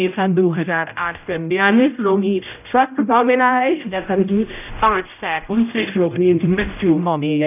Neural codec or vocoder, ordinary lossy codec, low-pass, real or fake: codec, 16 kHz, 0.5 kbps, X-Codec, HuBERT features, trained on general audio; none; 3.6 kHz; fake